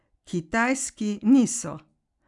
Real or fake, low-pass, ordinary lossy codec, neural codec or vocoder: real; 10.8 kHz; none; none